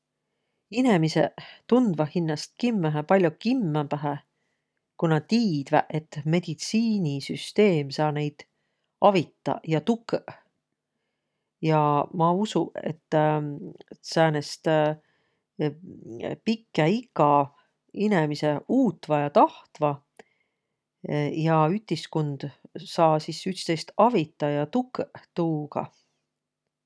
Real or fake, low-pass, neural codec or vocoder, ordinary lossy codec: real; none; none; none